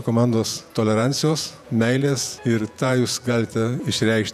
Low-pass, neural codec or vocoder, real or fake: 14.4 kHz; vocoder, 48 kHz, 128 mel bands, Vocos; fake